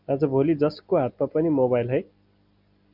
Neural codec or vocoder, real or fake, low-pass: none; real; 5.4 kHz